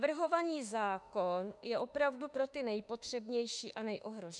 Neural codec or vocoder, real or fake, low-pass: autoencoder, 48 kHz, 32 numbers a frame, DAC-VAE, trained on Japanese speech; fake; 10.8 kHz